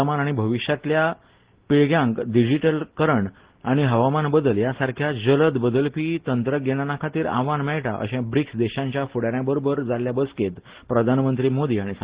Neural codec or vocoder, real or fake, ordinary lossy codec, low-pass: none; real; Opus, 16 kbps; 3.6 kHz